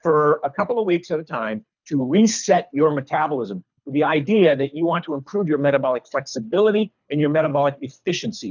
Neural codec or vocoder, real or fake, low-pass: codec, 24 kHz, 3 kbps, HILCodec; fake; 7.2 kHz